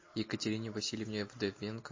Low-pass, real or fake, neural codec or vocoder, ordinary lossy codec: 7.2 kHz; real; none; MP3, 32 kbps